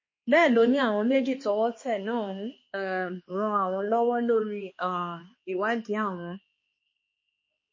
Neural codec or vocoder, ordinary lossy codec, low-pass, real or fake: codec, 16 kHz, 2 kbps, X-Codec, HuBERT features, trained on balanced general audio; MP3, 32 kbps; 7.2 kHz; fake